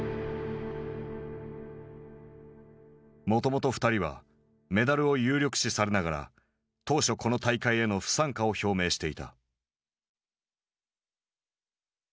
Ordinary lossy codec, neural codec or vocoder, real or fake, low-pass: none; none; real; none